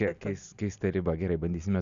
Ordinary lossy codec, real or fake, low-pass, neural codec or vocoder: Opus, 64 kbps; real; 7.2 kHz; none